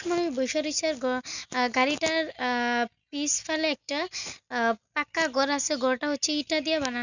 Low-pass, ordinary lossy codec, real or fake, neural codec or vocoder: 7.2 kHz; none; real; none